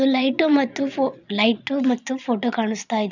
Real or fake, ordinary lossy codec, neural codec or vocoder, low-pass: fake; none; vocoder, 22.05 kHz, 80 mel bands, Vocos; 7.2 kHz